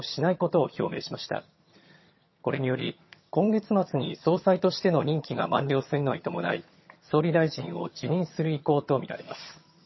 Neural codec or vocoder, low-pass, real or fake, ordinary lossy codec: vocoder, 22.05 kHz, 80 mel bands, HiFi-GAN; 7.2 kHz; fake; MP3, 24 kbps